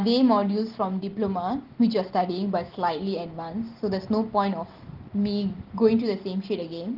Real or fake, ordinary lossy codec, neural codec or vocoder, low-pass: real; Opus, 16 kbps; none; 5.4 kHz